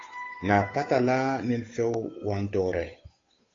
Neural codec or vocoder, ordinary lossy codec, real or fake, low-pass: codec, 16 kHz, 8 kbps, FunCodec, trained on Chinese and English, 25 frames a second; AAC, 32 kbps; fake; 7.2 kHz